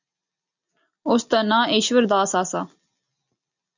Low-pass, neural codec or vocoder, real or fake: 7.2 kHz; none; real